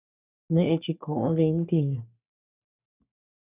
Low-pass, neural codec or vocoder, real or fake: 3.6 kHz; codec, 44.1 kHz, 3.4 kbps, Pupu-Codec; fake